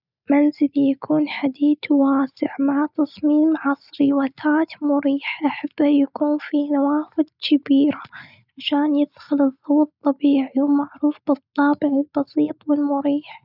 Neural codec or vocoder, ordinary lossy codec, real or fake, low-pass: none; none; real; 5.4 kHz